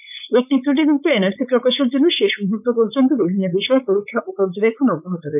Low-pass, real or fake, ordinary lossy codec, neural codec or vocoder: 3.6 kHz; fake; none; codec, 16 kHz, 4.8 kbps, FACodec